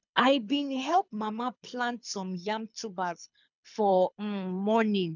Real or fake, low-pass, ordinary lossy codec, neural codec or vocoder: fake; 7.2 kHz; none; codec, 24 kHz, 6 kbps, HILCodec